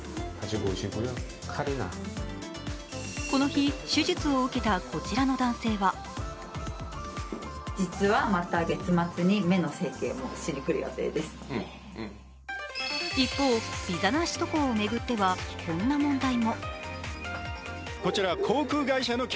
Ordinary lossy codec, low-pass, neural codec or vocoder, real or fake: none; none; none; real